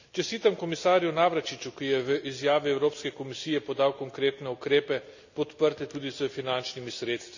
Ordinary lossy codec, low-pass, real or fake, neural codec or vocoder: none; 7.2 kHz; real; none